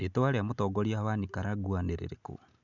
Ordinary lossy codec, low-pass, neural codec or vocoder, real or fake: none; 7.2 kHz; none; real